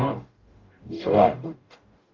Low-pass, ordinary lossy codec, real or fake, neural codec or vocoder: 7.2 kHz; Opus, 32 kbps; fake; codec, 44.1 kHz, 0.9 kbps, DAC